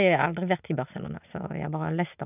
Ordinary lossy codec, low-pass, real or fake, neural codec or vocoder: none; 3.6 kHz; real; none